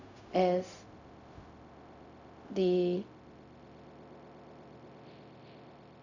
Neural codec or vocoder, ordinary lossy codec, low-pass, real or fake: codec, 16 kHz, 0.4 kbps, LongCat-Audio-Codec; none; 7.2 kHz; fake